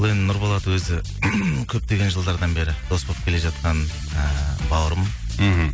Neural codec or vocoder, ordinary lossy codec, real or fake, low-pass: none; none; real; none